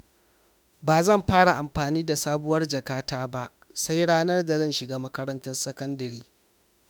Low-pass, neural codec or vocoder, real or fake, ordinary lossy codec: none; autoencoder, 48 kHz, 32 numbers a frame, DAC-VAE, trained on Japanese speech; fake; none